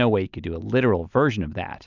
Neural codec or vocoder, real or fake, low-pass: none; real; 7.2 kHz